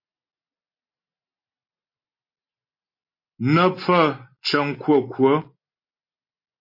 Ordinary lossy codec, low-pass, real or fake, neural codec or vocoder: MP3, 24 kbps; 5.4 kHz; real; none